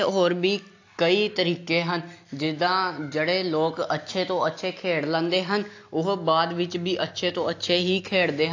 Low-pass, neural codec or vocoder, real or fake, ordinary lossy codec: 7.2 kHz; none; real; AAC, 48 kbps